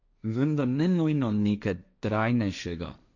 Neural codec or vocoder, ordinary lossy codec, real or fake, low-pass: codec, 16 kHz, 1.1 kbps, Voila-Tokenizer; none; fake; 7.2 kHz